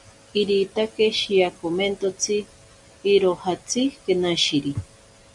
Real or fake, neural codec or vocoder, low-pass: real; none; 10.8 kHz